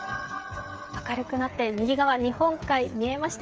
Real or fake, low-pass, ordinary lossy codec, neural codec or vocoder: fake; none; none; codec, 16 kHz, 8 kbps, FreqCodec, smaller model